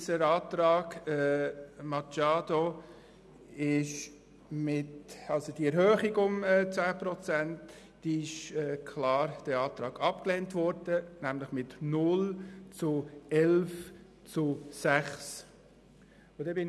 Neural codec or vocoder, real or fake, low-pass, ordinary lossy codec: none; real; none; none